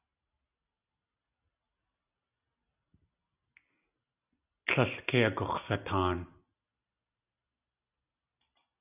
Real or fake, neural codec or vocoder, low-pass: real; none; 3.6 kHz